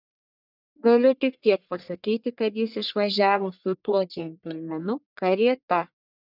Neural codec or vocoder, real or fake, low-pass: codec, 44.1 kHz, 1.7 kbps, Pupu-Codec; fake; 5.4 kHz